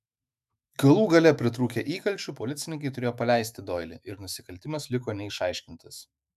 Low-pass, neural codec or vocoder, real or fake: 14.4 kHz; autoencoder, 48 kHz, 128 numbers a frame, DAC-VAE, trained on Japanese speech; fake